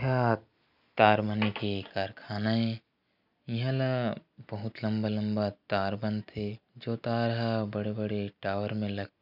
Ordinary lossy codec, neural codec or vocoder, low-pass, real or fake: none; none; 5.4 kHz; real